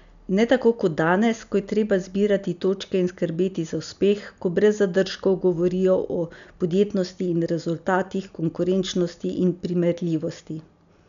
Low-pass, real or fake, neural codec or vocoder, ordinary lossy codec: 7.2 kHz; real; none; none